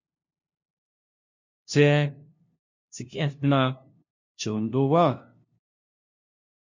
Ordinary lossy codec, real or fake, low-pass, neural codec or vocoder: MP3, 48 kbps; fake; 7.2 kHz; codec, 16 kHz, 0.5 kbps, FunCodec, trained on LibriTTS, 25 frames a second